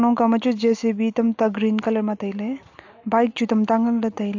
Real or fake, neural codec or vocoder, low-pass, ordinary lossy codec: real; none; 7.2 kHz; AAC, 48 kbps